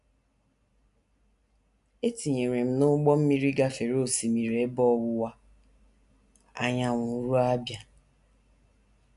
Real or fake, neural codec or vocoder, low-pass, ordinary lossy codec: real; none; 10.8 kHz; none